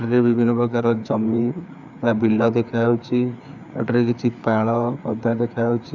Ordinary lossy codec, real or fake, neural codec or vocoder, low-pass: none; fake; codec, 16 kHz, 4 kbps, FreqCodec, larger model; 7.2 kHz